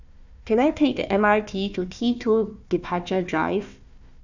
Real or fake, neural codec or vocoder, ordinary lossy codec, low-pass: fake; codec, 16 kHz, 1 kbps, FunCodec, trained on Chinese and English, 50 frames a second; none; 7.2 kHz